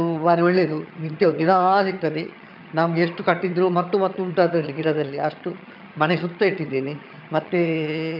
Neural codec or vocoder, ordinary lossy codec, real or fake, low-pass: vocoder, 22.05 kHz, 80 mel bands, HiFi-GAN; none; fake; 5.4 kHz